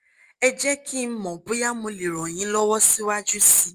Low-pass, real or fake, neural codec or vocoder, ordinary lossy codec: 14.4 kHz; real; none; Opus, 32 kbps